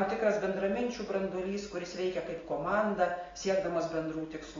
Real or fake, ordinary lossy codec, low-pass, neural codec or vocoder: real; AAC, 32 kbps; 7.2 kHz; none